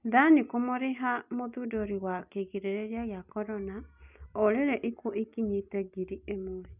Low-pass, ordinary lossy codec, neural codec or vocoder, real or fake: 3.6 kHz; none; none; real